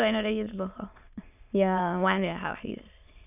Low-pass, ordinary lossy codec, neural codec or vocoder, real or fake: 3.6 kHz; none; autoencoder, 22.05 kHz, a latent of 192 numbers a frame, VITS, trained on many speakers; fake